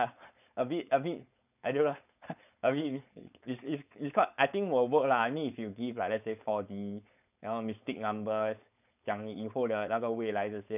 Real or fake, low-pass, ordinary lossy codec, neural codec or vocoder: fake; 3.6 kHz; none; codec, 16 kHz, 4.8 kbps, FACodec